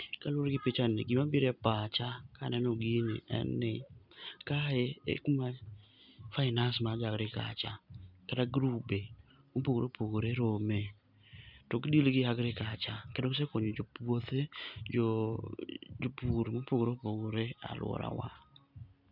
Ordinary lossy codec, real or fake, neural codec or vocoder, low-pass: none; real; none; 5.4 kHz